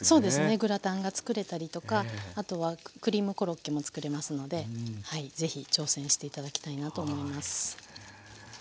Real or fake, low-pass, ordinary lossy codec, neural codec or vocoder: real; none; none; none